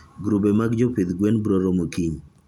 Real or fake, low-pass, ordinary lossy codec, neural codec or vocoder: real; 19.8 kHz; none; none